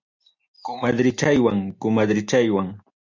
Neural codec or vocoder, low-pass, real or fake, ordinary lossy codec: none; 7.2 kHz; real; MP3, 64 kbps